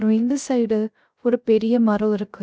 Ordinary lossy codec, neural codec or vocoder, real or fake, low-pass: none; codec, 16 kHz, 0.3 kbps, FocalCodec; fake; none